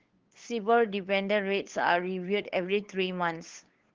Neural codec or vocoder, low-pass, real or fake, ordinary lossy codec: codec, 16 kHz, 8 kbps, FunCodec, trained on LibriTTS, 25 frames a second; 7.2 kHz; fake; Opus, 16 kbps